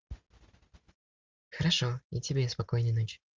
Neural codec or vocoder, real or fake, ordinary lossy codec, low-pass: none; real; Opus, 32 kbps; 7.2 kHz